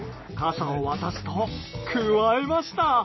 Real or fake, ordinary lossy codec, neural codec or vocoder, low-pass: real; MP3, 24 kbps; none; 7.2 kHz